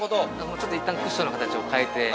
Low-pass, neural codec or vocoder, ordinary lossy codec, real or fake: none; none; none; real